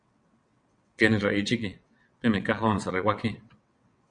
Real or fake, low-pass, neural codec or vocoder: fake; 9.9 kHz; vocoder, 22.05 kHz, 80 mel bands, WaveNeXt